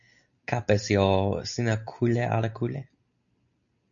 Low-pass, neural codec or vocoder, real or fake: 7.2 kHz; none; real